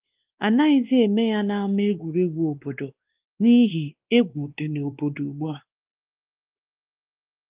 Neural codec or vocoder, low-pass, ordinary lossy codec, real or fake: codec, 16 kHz, 2 kbps, X-Codec, WavLM features, trained on Multilingual LibriSpeech; 3.6 kHz; Opus, 32 kbps; fake